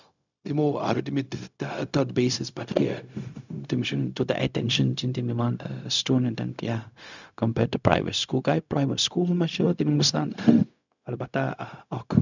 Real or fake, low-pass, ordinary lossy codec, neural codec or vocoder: fake; 7.2 kHz; none; codec, 16 kHz, 0.4 kbps, LongCat-Audio-Codec